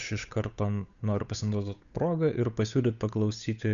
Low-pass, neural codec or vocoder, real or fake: 7.2 kHz; codec, 16 kHz, 8 kbps, FunCodec, trained on Chinese and English, 25 frames a second; fake